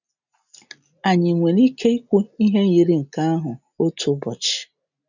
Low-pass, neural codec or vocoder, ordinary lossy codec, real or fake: 7.2 kHz; none; none; real